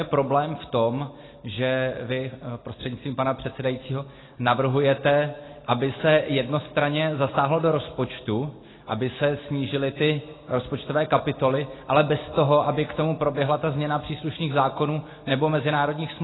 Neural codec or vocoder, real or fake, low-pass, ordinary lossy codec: none; real; 7.2 kHz; AAC, 16 kbps